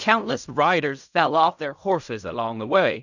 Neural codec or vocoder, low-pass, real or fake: codec, 16 kHz in and 24 kHz out, 0.4 kbps, LongCat-Audio-Codec, fine tuned four codebook decoder; 7.2 kHz; fake